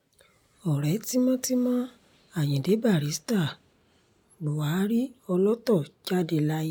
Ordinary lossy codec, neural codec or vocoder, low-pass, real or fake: none; none; none; real